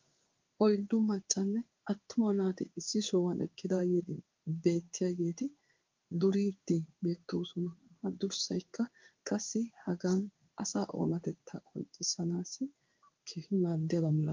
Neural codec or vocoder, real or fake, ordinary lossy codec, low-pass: codec, 16 kHz in and 24 kHz out, 1 kbps, XY-Tokenizer; fake; Opus, 24 kbps; 7.2 kHz